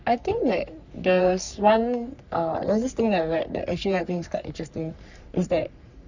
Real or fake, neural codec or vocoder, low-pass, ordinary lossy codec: fake; codec, 44.1 kHz, 3.4 kbps, Pupu-Codec; 7.2 kHz; none